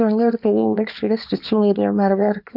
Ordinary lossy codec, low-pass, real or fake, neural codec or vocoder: none; 5.4 kHz; fake; codec, 24 kHz, 0.9 kbps, WavTokenizer, small release